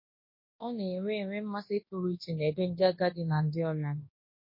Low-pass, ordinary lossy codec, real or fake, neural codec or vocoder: 5.4 kHz; MP3, 24 kbps; fake; codec, 24 kHz, 0.9 kbps, WavTokenizer, large speech release